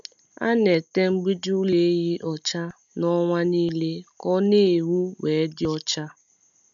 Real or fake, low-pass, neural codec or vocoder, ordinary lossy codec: real; 7.2 kHz; none; none